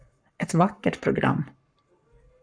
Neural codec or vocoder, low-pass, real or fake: codec, 44.1 kHz, 7.8 kbps, Pupu-Codec; 9.9 kHz; fake